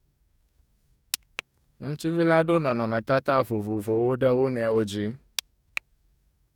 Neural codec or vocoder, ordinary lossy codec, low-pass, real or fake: codec, 44.1 kHz, 2.6 kbps, DAC; none; 19.8 kHz; fake